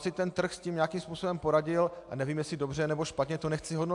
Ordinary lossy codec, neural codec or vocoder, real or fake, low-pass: AAC, 64 kbps; none; real; 10.8 kHz